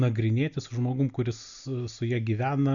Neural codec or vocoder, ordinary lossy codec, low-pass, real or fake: none; MP3, 96 kbps; 7.2 kHz; real